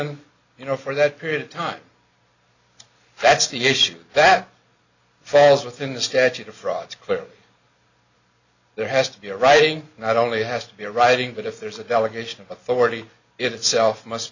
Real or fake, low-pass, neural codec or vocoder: real; 7.2 kHz; none